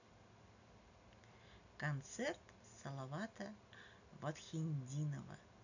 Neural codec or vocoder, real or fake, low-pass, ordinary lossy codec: none; real; 7.2 kHz; none